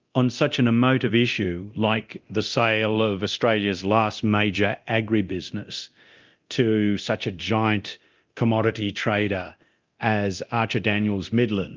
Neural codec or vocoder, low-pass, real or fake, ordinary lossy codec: codec, 24 kHz, 0.9 kbps, DualCodec; 7.2 kHz; fake; Opus, 24 kbps